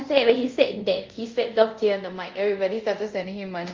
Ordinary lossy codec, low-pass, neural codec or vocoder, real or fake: Opus, 24 kbps; 7.2 kHz; codec, 24 kHz, 0.5 kbps, DualCodec; fake